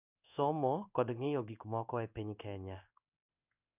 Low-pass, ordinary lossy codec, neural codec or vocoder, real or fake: 3.6 kHz; none; codec, 16 kHz in and 24 kHz out, 1 kbps, XY-Tokenizer; fake